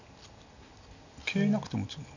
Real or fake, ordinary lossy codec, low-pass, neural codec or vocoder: real; none; 7.2 kHz; none